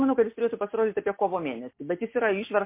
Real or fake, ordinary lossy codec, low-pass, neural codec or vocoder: real; MP3, 24 kbps; 3.6 kHz; none